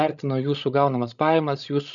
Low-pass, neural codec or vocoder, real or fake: 7.2 kHz; codec, 16 kHz, 16 kbps, FreqCodec, larger model; fake